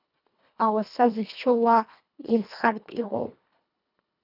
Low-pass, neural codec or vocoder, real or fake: 5.4 kHz; codec, 24 kHz, 1.5 kbps, HILCodec; fake